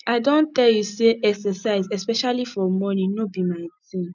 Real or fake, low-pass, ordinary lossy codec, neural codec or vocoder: real; 7.2 kHz; none; none